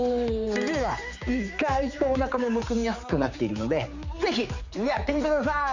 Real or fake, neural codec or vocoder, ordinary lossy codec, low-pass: fake; codec, 16 kHz, 4 kbps, X-Codec, HuBERT features, trained on general audio; Opus, 64 kbps; 7.2 kHz